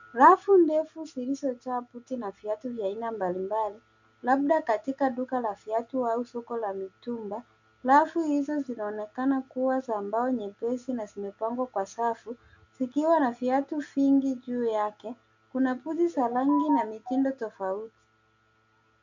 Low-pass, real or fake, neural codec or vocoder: 7.2 kHz; real; none